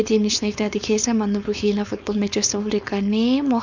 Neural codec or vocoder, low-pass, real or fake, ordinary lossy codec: codec, 16 kHz, 4.8 kbps, FACodec; 7.2 kHz; fake; none